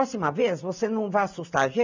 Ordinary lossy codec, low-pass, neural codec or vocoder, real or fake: none; 7.2 kHz; none; real